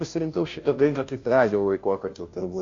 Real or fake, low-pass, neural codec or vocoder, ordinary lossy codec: fake; 7.2 kHz; codec, 16 kHz, 0.5 kbps, FunCodec, trained on Chinese and English, 25 frames a second; AAC, 48 kbps